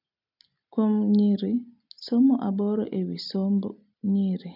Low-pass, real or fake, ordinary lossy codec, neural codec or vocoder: 5.4 kHz; real; none; none